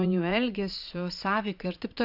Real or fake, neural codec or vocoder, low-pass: fake; vocoder, 44.1 kHz, 80 mel bands, Vocos; 5.4 kHz